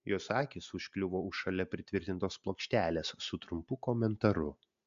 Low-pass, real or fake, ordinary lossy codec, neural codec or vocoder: 7.2 kHz; real; AAC, 64 kbps; none